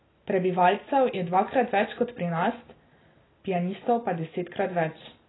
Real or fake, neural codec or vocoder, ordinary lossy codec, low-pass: real; none; AAC, 16 kbps; 7.2 kHz